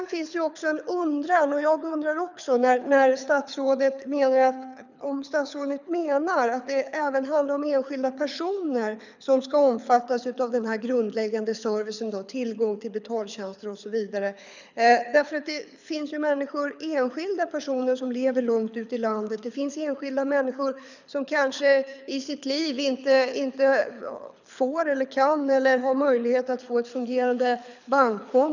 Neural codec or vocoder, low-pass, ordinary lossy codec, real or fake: codec, 24 kHz, 6 kbps, HILCodec; 7.2 kHz; none; fake